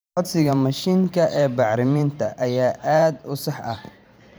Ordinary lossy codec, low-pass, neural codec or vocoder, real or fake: none; none; vocoder, 44.1 kHz, 128 mel bands every 512 samples, BigVGAN v2; fake